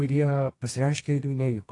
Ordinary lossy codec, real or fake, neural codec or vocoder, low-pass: AAC, 48 kbps; fake; codec, 24 kHz, 0.9 kbps, WavTokenizer, medium music audio release; 10.8 kHz